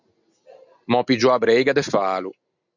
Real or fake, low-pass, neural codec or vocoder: real; 7.2 kHz; none